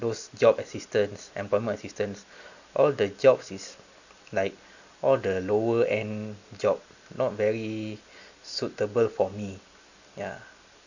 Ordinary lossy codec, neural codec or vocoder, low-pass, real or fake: none; none; 7.2 kHz; real